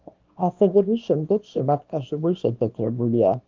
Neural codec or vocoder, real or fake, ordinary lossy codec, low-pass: codec, 24 kHz, 0.9 kbps, WavTokenizer, small release; fake; Opus, 24 kbps; 7.2 kHz